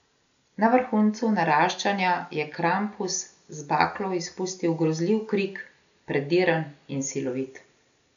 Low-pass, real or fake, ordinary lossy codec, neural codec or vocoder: 7.2 kHz; real; none; none